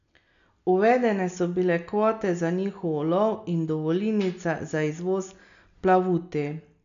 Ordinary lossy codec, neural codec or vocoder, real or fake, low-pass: none; none; real; 7.2 kHz